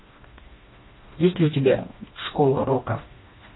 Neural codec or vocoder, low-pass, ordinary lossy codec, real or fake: codec, 16 kHz, 2 kbps, FreqCodec, smaller model; 7.2 kHz; AAC, 16 kbps; fake